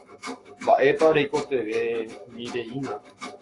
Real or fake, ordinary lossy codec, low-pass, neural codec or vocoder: fake; AAC, 48 kbps; 10.8 kHz; codec, 44.1 kHz, 7.8 kbps, Pupu-Codec